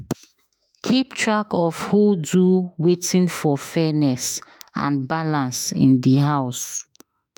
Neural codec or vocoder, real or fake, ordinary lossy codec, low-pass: autoencoder, 48 kHz, 32 numbers a frame, DAC-VAE, trained on Japanese speech; fake; none; none